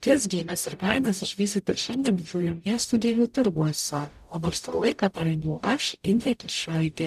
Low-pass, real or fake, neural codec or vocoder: 14.4 kHz; fake; codec, 44.1 kHz, 0.9 kbps, DAC